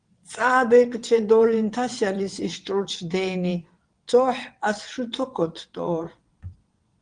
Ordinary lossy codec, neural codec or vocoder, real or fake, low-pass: Opus, 24 kbps; vocoder, 22.05 kHz, 80 mel bands, WaveNeXt; fake; 9.9 kHz